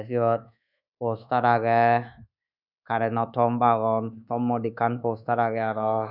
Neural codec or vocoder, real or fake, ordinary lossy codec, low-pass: codec, 24 kHz, 1.2 kbps, DualCodec; fake; none; 5.4 kHz